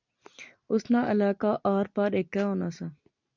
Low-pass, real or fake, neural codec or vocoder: 7.2 kHz; real; none